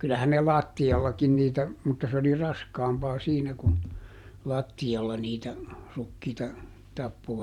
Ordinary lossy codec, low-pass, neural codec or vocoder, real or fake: none; 19.8 kHz; vocoder, 44.1 kHz, 128 mel bands every 512 samples, BigVGAN v2; fake